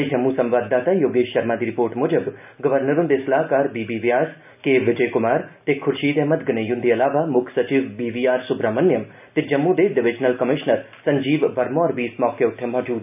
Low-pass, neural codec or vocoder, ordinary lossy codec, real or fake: 3.6 kHz; none; none; real